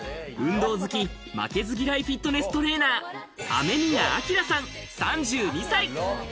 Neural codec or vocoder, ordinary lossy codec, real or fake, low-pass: none; none; real; none